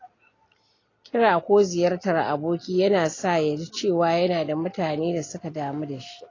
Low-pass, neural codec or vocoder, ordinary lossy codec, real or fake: 7.2 kHz; none; AAC, 32 kbps; real